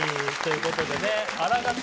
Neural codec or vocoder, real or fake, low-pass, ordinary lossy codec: none; real; none; none